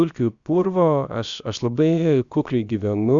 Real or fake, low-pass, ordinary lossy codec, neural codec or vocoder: fake; 7.2 kHz; Opus, 64 kbps; codec, 16 kHz, about 1 kbps, DyCAST, with the encoder's durations